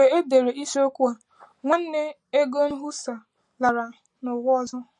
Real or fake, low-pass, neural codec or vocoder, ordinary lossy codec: real; 10.8 kHz; none; MP3, 48 kbps